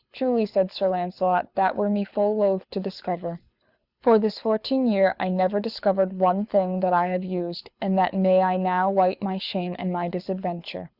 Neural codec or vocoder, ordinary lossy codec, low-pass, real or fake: codec, 24 kHz, 6 kbps, HILCodec; Opus, 64 kbps; 5.4 kHz; fake